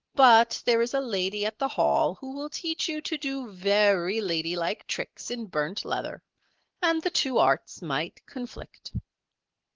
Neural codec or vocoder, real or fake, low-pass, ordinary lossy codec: none; real; 7.2 kHz; Opus, 16 kbps